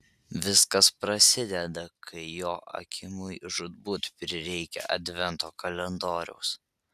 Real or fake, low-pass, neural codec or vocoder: real; 14.4 kHz; none